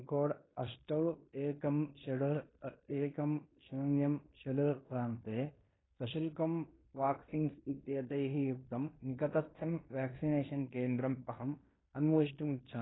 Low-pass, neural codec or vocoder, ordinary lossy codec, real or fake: 7.2 kHz; codec, 16 kHz in and 24 kHz out, 0.9 kbps, LongCat-Audio-Codec, fine tuned four codebook decoder; AAC, 16 kbps; fake